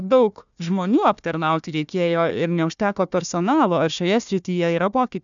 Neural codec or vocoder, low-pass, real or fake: codec, 16 kHz, 1 kbps, FunCodec, trained on Chinese and English, 50 frames a second; 7.2 kHz; fake